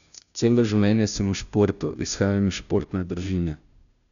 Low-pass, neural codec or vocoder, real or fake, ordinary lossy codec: 7.2 kHz; codec, 16 kHz, 0.5 kbps, FunCodec, trained on Chinese and English, 25 frames a second; fake; none